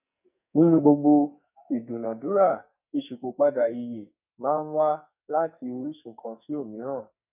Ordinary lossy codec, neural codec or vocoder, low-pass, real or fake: none; codec, 44.1 kHz, 2.6 kbps, SNAC; 3.6 kHz; fake